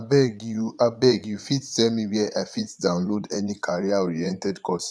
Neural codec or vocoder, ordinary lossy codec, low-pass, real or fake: vocoder, 22.05 kHz, 80 mel bands, Vocos; none; none; fake